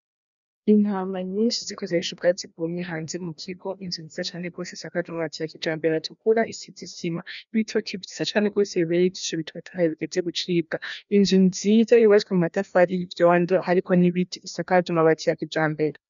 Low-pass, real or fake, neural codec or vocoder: 7.2 kHz; fake; codec, 16 kHz, 1 kbps, FreqCodec, larger model